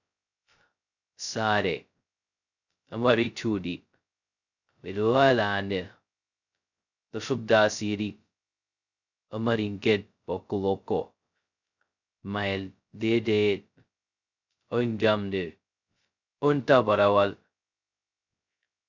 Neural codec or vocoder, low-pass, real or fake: codec, 16 kHz, 0.2 kbps, FocalCodec; 7.2 kHz; fake